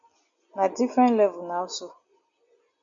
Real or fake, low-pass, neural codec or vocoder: real; 7.2 kHz; none